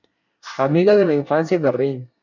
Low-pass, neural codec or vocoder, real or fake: 7.2 kHz; codec, 24 kHz, 1 kbps, SNAC; fake